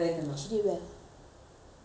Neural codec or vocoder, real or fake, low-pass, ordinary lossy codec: none; real; none; none